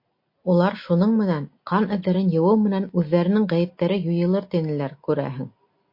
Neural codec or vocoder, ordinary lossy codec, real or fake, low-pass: none; MP3, 32 kbps; real; 5.4 kHz